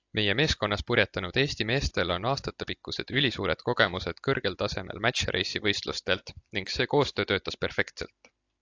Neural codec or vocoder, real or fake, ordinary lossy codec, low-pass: none; real; AAC, 48 kbps; 7.2 kHz